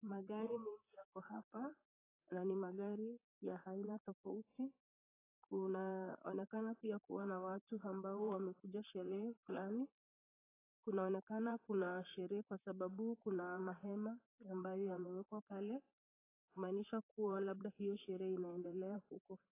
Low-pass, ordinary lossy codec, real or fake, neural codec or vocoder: 3.6 kHz; AAC, 16 kbps; fake; vocoder, 44.1 kHz, 128 mel bands, Pupu-Vocoder